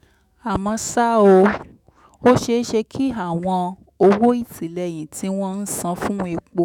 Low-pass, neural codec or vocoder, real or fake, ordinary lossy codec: 19.8 kHz; autoencoder, 48 kHz, 128 numbers a frame, DAC-VAE, trained on Japanese speech; fake; none